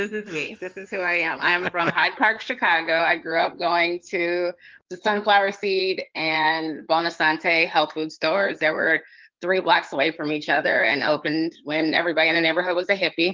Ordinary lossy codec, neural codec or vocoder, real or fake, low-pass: Opus, 32 kbps; codec, 16 kHz in and 24 kHz out, 2.2 kbps, FireRedTTS-2 codec; fake; 7.2 kHz